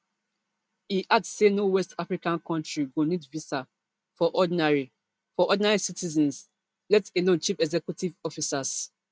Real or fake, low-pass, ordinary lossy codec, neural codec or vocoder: real; none; none; none